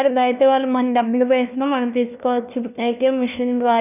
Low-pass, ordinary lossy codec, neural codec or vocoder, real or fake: 3.6 kHz; none; codec, 16 kHz, 1 kbps, FunCodec, trained on LibriTTS, 50 frames a second; fake